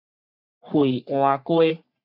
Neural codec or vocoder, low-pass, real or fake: codec, 44.1 kHz, 3.4 kbps, Pupu-Codec; 5.4 kHz; fake